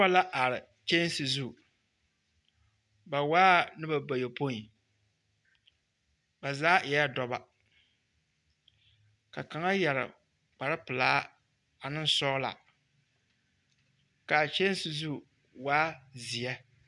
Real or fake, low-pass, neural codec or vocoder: real; 10.8 kHz; none